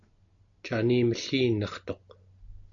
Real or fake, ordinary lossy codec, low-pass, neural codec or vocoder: real; MP3, 64 kbps; 7.2 kHz; none